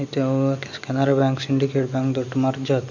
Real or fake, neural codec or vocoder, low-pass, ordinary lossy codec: real; none; 7.2 kHz; none